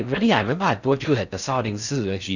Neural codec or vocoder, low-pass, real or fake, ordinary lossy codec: codec, 16 kHz in and 24 kHz out, 0.6 kbps, FocalCodec, streaming, 4096 codes; 7.2 kHz; fake; none